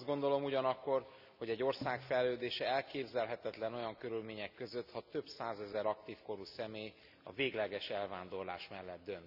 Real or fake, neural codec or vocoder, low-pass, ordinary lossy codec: real; none; 5.4 kHz; none